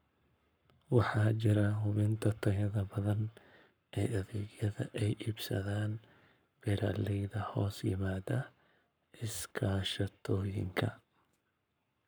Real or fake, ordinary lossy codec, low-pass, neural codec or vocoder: fake; none; none; codec, 44.1 kHz, 7.8 kbps, Pupu-Codec